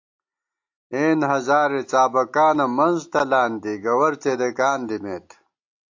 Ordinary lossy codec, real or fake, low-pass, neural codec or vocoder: AAC, 48 kbps; real; 7.2 kHz; none